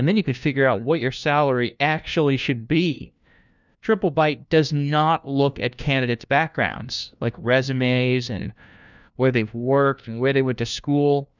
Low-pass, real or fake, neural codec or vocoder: 7.2 kHz; fake; codec, 16 kHz, 1 kbps, FunCodec, trained on LibriTTS, 50 frames a second